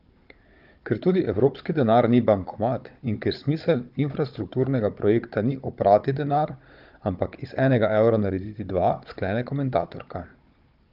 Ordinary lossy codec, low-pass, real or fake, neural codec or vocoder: Opus, 32 kbps; 5.4 kHz; fake; codec, 16 kHz, 16 kbps, FunCodec, trained on Chinese and English, 50 frames a second